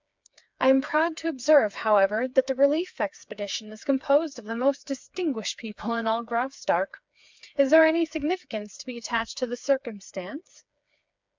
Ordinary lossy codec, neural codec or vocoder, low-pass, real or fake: MP3, 64 kbps; codec, 16 kHz, 4 kbps, FreqCodec, smaller model; 7.2 kHz; fake